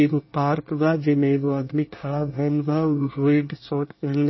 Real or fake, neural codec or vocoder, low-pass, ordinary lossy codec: fake; codec, 24 kHz, 1 kbps, SNAC; 7.2 kHz; MP3, 24 kbps